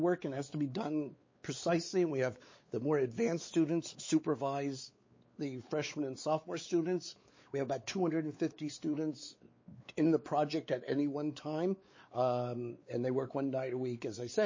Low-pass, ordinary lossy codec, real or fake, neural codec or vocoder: 7.2 kHz; MP3, 32 kbps; fake; codec, 16 kHz, 4 kbps, X-Codec, WavLM features, trained on Multilingual LibriSpeech